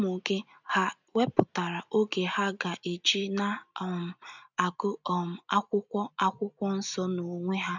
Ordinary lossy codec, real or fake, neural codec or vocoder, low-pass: none; real; none; 7.2 kHz